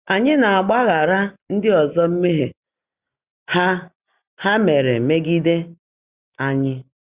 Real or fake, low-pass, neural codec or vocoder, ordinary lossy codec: real; 3.6 kHz; none; Opus, 64 kbps